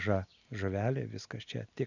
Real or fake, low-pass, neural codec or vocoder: real; 7.2 kHz; none